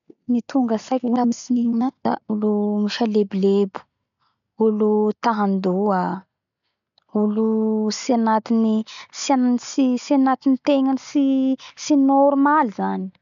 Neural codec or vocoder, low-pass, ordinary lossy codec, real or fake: none; 7.2 kHz; none; real